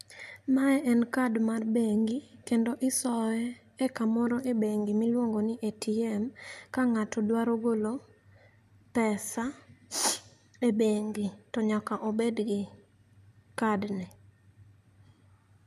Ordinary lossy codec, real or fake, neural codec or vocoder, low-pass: none; real; none; 14.4 kHz